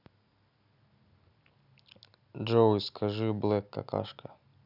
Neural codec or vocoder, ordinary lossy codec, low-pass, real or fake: none; none; 5.4 kHz; real